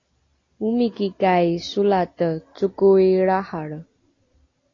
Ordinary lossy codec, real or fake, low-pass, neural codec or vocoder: AAC, 32 kbps; real; 7.2 kHz; none